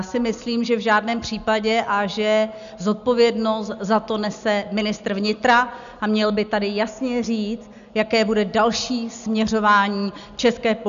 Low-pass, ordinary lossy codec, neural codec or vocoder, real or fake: 7.2 kHz; MP3, 96 kbps; none; real